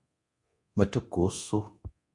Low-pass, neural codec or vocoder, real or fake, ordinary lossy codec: 10.8 kHz; codec, 24 kHz, 0.9 kbps, DualCodec; fake; MP3, 64 kbps